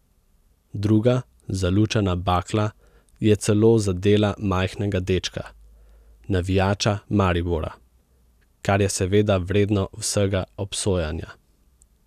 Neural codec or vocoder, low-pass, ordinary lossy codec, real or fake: none; 14.4 kHz; none; real